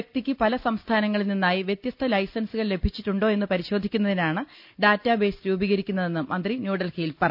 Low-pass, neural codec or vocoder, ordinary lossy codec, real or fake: 5.4 kHz; none; none; real